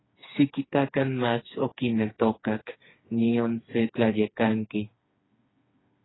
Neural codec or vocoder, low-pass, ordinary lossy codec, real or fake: codec, 16 kHz, 4 kbps, FreqCodec, smaller model; 7.2 kHz; AAC, 16 kbps; fake